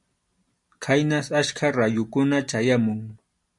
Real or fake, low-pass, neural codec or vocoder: real; 10.8 kHz; none